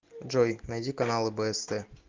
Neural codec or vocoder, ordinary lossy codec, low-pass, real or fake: none; Opus, 24 kbps; 7.2 kHz; real